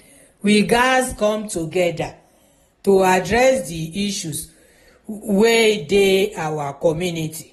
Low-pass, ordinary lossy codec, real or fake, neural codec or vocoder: 19.8 kHz; AAC, 32 kbps; real; none